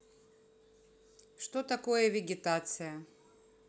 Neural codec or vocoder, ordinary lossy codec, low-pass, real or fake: none; none; none; real